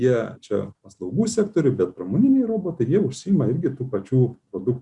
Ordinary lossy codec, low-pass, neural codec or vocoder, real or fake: Opus, 64 kbps; 10.8 kHz; none; real